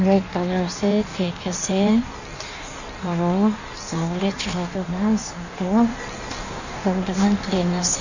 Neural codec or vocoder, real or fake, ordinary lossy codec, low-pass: codec, 16 kHz in and 24 kHz out, 1.1 kbps, FireRedTTS-2 codec; fake; none; 7.2 kHz